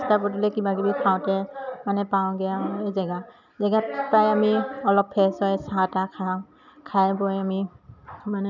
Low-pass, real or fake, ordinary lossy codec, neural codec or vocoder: 7.2 kHz; real; none; none